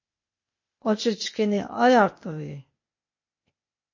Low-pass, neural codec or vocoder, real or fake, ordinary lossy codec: 7.2 kHz; codec, 16 kHz, 0.8 kbps, ZipCodec; fake; MP3, 32 kbps